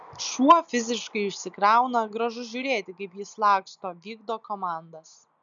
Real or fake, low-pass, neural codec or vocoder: real; 7.2 kHz; none